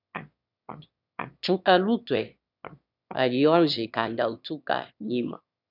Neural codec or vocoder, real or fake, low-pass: autoencoder, 22.05 kHz, a latent of 192 numbers a frame, VITS, trained on one speaker; fake; 5.4 kHz